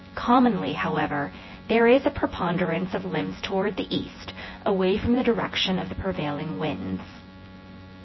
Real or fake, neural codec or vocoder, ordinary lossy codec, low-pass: fake; vocoder, 24 kHz, 100 mel bands, Vocos; MP3, 24 kbps; 7.2 kHz